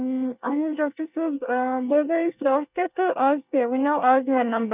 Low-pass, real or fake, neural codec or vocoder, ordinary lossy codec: 3.6 kHz; fake; codec, 24 kHz, 1 kbps, SNAC; none